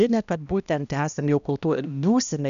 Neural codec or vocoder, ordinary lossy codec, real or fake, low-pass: codec, 16 kHz, 1 kbps, X-Codec, HuBERT features, trained on balanced general audio; MP3, 96 kbps; fake; 7.2 kHz